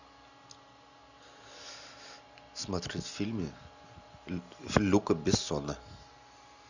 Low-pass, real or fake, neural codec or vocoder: 7.2 kHz; real; none